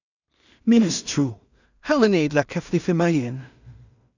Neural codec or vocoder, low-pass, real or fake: codec, 16 kHz in and 24 kHz out, 0.4 kbps, LongCat-Audio-Codec, two codebook decoder; 7.2 kHz; fake